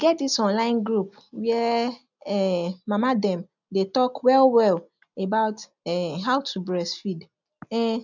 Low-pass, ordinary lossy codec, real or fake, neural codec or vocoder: 7.2 kHz; none; real; none